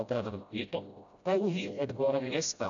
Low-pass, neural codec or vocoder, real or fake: 7.2 kHz; codec, 16 kHz, 0.5 kbps, FreqCodec, smaller model; fake